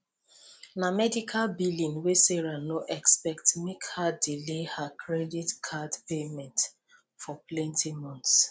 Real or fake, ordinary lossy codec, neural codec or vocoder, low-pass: real; none; none; none